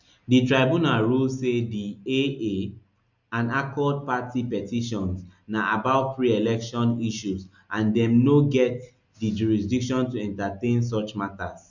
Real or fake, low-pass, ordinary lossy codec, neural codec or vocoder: real; 7.2 kHz; none; none